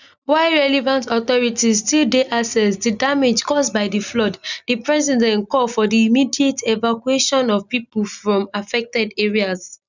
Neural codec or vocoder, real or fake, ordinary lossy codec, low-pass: none; real; none; 7.2 kHz